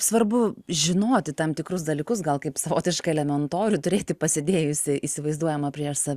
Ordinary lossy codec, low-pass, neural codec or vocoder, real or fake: Opus, 64 kbps; 14.4 kHz; none; real